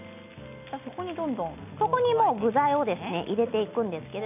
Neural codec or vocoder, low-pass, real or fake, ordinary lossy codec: none; 3.6 kHz; real; none